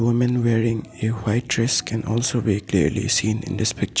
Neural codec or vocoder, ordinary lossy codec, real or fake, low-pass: none; none; real; none